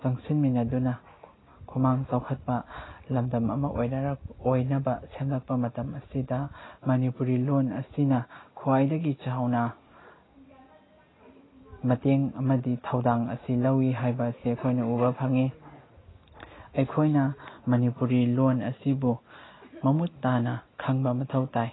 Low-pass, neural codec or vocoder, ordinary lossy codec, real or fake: 7.2 kHz; none; AAC, 16 kbps; real